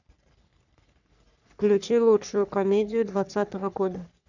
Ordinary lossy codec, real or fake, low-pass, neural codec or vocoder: none; fake; 7.2 kHz; codec, 44.1 kHz, 3.4 kbps, Pupu-Codec